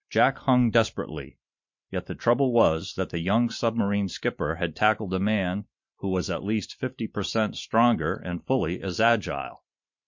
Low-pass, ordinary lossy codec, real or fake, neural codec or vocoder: 7.2 kHz; MP3, 48 kbps; fake; vocoder, 44.1 kHz, 128 mel bands every 512 samples, BigVGAN v2